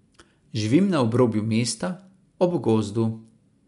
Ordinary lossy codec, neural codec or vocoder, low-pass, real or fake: MP3, 64 kbps; none; 10.8 kHz; real